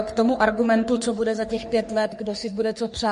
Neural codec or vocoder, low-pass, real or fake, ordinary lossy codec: codec, 44.1 kHz, 3.4 kbps, Pupu-Codec; 14.4 kHz; fake; MP3, 48 kbps